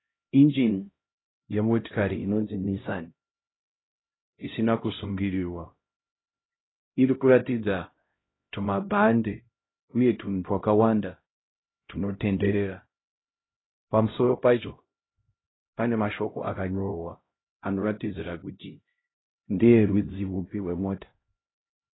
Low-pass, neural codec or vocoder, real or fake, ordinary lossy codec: 7.2 kHz; codec, 16 kHz, 0.5 kbps, X-Codec, HuBERT features, trained on LibriSpeech; fake; AAC, 16 kbps